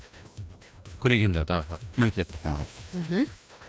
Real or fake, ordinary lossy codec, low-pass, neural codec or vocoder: fake; none; none; codec, 16 kHz, 1 kbps, FreqCodec, larger model